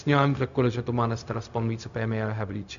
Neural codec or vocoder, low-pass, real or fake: codec, 16 kHz, 0.4 kbps, LongCat-Audio-Codec; 7.2 kHz; fake